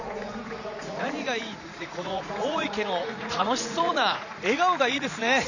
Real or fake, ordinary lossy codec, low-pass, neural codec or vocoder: fake; none; 7.2 kHz; vocoder, 44.1 kHz, 80 mel bands, Vocos